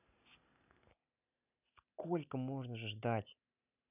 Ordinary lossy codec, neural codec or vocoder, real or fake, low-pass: none; none; real; 3.6 kHz